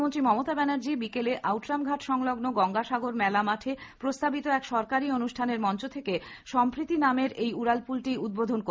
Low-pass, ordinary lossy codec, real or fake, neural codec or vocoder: none; none; real; none